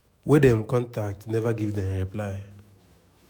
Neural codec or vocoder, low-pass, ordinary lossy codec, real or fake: autoencoder, 48 kHz, 128 numbers a frame, DAC-VAE, trained on Japanese speech; none; none; fake